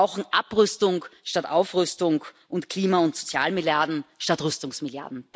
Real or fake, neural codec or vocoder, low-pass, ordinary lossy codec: real; none; none; none